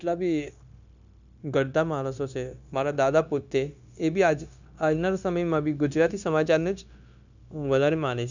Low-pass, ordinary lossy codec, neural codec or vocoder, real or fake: 7.2 kHz; none; codec, 16 kHz, 0.9 kbps, LongCat-Audio-Codec; fake